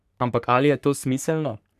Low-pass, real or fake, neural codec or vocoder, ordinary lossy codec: 14.4 kHz; fake; codec, 44.1 kHz, 3.4 kbps, Pupu-Codec; none